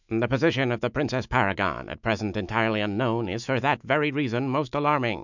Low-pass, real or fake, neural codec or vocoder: 7.2 kHz; fake; autoencoder, 48 kHz, 128 numbers a frame, DAC-VAE, trained on Japanese speech